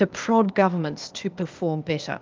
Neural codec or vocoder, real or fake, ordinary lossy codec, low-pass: codec, 16 kHz, 0.8 kbps, ZipCodec; fake; Opus, 24 kbps; 7.2 kHz